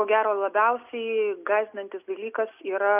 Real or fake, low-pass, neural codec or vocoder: real; 3.6 kHz; none